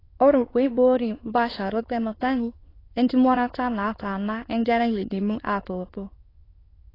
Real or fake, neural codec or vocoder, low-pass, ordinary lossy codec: fake; autoencoder, 22.05 kHz, a latent of 192 numbers a frame, VITS, trained on many speakers; 5.4 kHz; AAC, 24 kbps